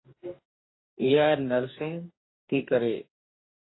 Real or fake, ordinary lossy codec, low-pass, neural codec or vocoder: fake; AAC, 16 kbps; 7.2 kHz; codec, 44.1 kHz, 2.6 kbps, DAC